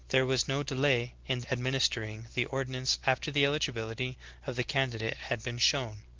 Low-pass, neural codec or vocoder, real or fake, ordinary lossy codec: 7.2 kHz; none; real; Opus, 16 kbps